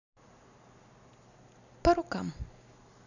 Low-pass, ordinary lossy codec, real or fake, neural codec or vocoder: 7.2 kHz; none; real; none